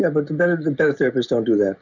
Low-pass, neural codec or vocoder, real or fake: 7.2 kHz; none; real